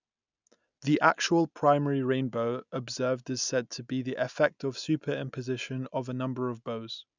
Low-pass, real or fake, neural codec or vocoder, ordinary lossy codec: 7.2 kHz; real; none; none